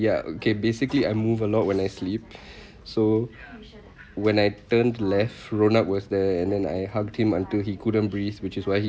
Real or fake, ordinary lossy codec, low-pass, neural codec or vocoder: real; none; none; none